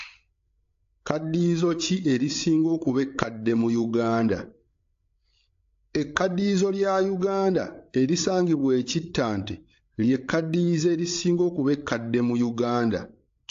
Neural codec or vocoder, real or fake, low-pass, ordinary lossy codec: codec, 16 kHz, 8 kbps, FreqCodec, larger model; fake; 7.2 kHz; AAC, 48 kbps